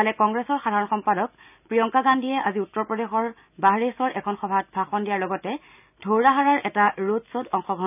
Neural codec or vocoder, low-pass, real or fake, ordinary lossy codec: none; 3.6 kHz; real; none